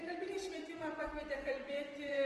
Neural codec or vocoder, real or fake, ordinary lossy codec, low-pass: vocoder, 24 kHz, 100 mel bands, Vocos; fake; Opus, 24 kbps; 10.8 kHz